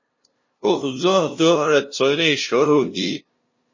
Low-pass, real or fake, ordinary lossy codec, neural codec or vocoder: 7.2 kHz; fake; MP3, 32 kbps; codec, 16 kHz, 0.5 kbps, FunCodec, trained on LibriTTS, 25 frames a second